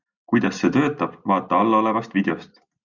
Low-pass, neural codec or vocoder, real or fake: 7.2 kHz; none; real